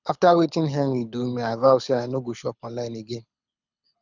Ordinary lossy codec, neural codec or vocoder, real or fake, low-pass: none; codec, 24 kHz, 6 kbps, HILCodec; fake; 7.2 kHz